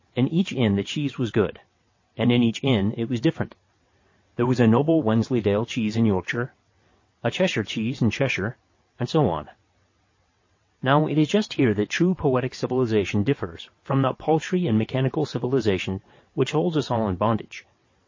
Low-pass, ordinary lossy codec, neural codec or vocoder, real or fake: 7.2 kHz; MP3, 32 kbps; vocoder, 22.05 kHz, 80 mel bands, WaveNeXt; fake